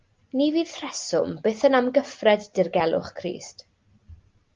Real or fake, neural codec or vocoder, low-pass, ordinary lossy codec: real; none; 7.2 kHz; Opus, 32 kbps